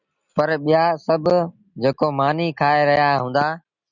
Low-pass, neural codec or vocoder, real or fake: 7.2 kHz; none; real